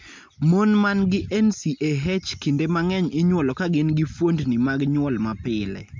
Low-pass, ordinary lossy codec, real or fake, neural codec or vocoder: 7.2 kHz; MP3, 64 kbps; real; none